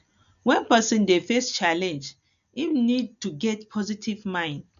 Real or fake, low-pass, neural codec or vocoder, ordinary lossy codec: real; 7.2 kHz; none; none